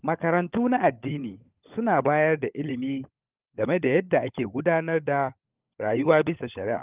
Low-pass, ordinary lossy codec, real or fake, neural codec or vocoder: 3.6 kHz; Opus, 24 kbps; fake; codec, 16 kHz, 16 kbps, FunCodec, trained on LibriTTS, 50 frames a second